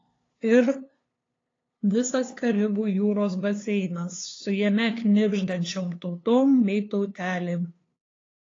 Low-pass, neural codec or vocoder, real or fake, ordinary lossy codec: 7.2 kHz; codec, 16 kHz, 2 kbps, FunCodec, trained on LibriTTS, 25 frames a second; fake; AAC, 32 kbps